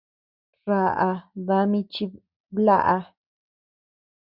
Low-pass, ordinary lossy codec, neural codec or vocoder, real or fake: 5.4 kHz; Opus, 64 kbps; none; real